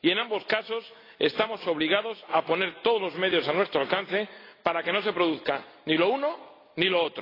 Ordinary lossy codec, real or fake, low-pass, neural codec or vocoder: AAC, 24 kbps; real; 5.4 kHz; none